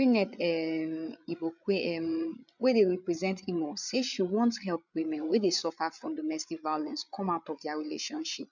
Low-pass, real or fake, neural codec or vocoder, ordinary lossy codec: 7.2 kHz; fake; codec, 16 kHz, 8 kbps, FreqCodec, larger model; none